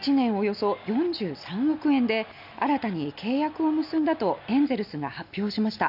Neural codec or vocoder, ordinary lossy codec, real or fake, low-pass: none; none; real; 5.4 kHz